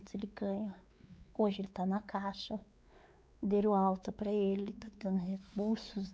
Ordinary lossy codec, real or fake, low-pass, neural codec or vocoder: none; fake; none; codec, 16 kHz, 4 kbps, X-Codec, WavLM features, trained on Multilingual LibriSpeech